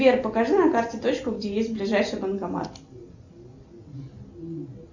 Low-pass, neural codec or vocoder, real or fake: 7.2 kHz; none; real